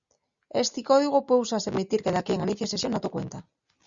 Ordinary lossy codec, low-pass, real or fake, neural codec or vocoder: Opus, 64 kbps; 7.2 kHz; real; none